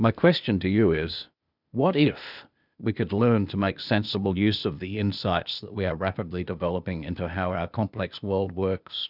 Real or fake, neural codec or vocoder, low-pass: fake; codec, 16 kHz, 0.8 kbps, ZipCodec; 5.4 kHz